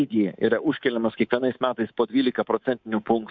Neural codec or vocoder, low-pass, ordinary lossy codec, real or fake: codec, 24 kHz, 3.1 kbps, DualCodec; 7.2 kHz; MP3, 64 kbps; fake